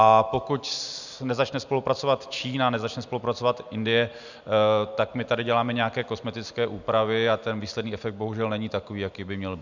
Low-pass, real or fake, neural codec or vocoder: 7.2 kHz; real; none